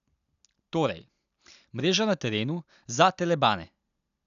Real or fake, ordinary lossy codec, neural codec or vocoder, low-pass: real; none; none; 7.2 kHz